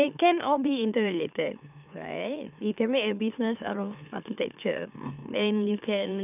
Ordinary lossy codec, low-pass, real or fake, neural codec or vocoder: none; 3.6 kHz; fake; autoencoder, 44.1 kHz, a latent of 192 numbers a frame, MeloTTS